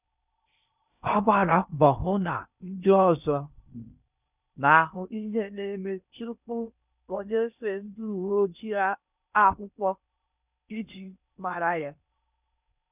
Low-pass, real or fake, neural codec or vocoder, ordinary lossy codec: 3.6 kHz; fake; codec, 16 kHz in and 24 kHz out, 0.6 kbps, FocalCodec, streaming, 4096 codes; none